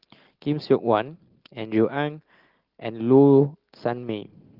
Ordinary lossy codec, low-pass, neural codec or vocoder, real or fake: Opus, 16 kbps; 5.4 kHz; none; real